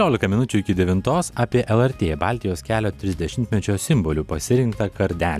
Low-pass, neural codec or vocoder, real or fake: 14.4 kHz; none; real